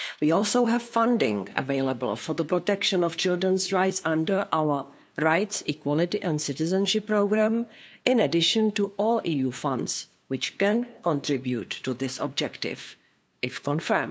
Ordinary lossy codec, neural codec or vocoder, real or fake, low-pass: none; codec, 16 kHz, 2 kbps, FunCodec, trained on LibriTTS, 25 frames a second; fake; none